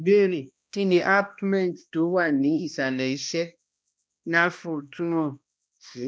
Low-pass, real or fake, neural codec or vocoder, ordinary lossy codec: none; fake; codec, 16 kHz, 1 kbps, X-Codec, HuBERT features, trained on balanced general audio; none